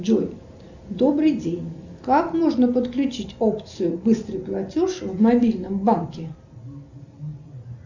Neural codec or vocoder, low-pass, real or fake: none; 7.2 kHz; real